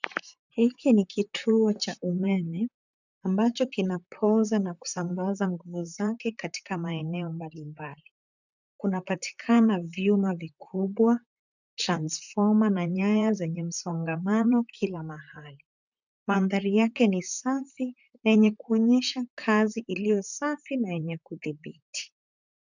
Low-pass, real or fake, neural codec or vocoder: 7.2 kHz; fake; vocoder, 44.1 kHz, 128 mel bands, Pupu-Vocoder